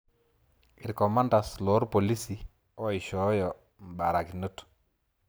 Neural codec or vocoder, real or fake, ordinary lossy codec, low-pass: none; real; none; none